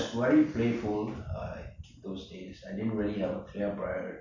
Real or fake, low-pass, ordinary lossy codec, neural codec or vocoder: real; 7.2 kHz; AAC, 48 kbps; none